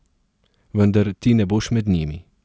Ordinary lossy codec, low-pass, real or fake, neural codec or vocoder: none; none; real; none